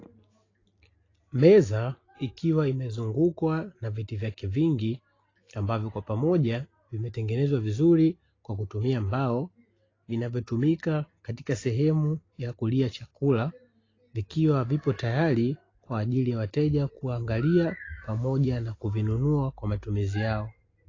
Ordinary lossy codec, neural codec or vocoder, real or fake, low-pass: AAC, 32 kbps; none; real; 7.2 kHz